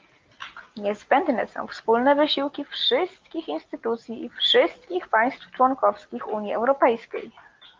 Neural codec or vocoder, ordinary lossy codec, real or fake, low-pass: none; Opus, 24 kbps; real; 7.2 kHz